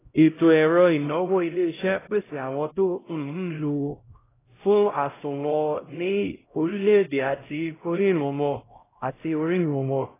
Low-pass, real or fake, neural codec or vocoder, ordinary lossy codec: 3.6 kHz; fake; codec, 16 kHz, 0.5 kbps, X-Codec, HuBERT features, trained on LibriSpeech; AAC, 16 kbps